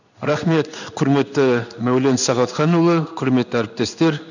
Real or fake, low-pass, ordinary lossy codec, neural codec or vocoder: fake; 7.2 kHz; none; codec, 16 kHz in and 24 kHz out, 1 kbps, XY-Tokenizer